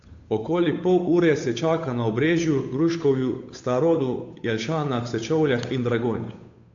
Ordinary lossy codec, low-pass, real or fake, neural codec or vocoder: AAC, 48 kbps; 7.2 kHz; fake; codec, 16 kHz, 8 kbps, FunCodec, trained on Chinese and English, 25 frames a second